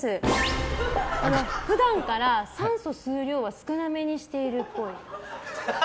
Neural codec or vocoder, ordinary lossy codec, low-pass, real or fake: none; none; none; real